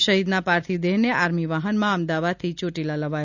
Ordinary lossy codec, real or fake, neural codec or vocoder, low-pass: none; real; none; 7.2 kHz